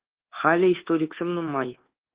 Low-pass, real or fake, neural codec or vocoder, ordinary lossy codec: 3.6 kHz; fake; codec, 24 kHz, 1.2 kbps, DualCodec; Opus, 16 kbps